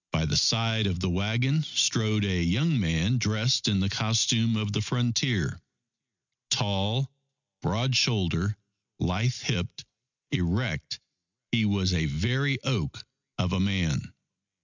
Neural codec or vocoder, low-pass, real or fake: none; 7.2 kHz; real